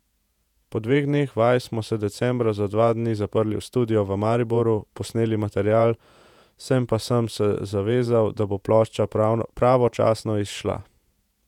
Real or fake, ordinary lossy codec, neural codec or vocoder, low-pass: fake; none; vocoder, 48 kHz, 128 mel bands, Vocos; 19.8 kHz